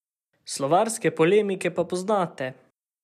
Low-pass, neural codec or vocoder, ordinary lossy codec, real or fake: 14.4 kHz; none; none; real